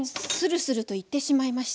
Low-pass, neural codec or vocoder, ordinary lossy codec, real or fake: none; none; none; real